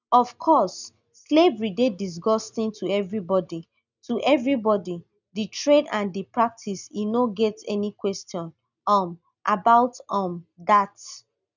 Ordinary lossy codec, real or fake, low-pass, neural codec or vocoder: none; real; 7.2 kHz; none